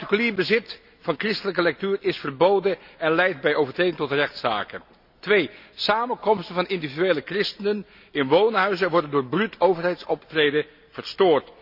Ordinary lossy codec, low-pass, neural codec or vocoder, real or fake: none; 5.4 kHz; none; real